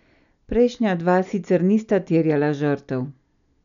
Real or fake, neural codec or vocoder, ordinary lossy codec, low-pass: real; none; none; 7.2 kHz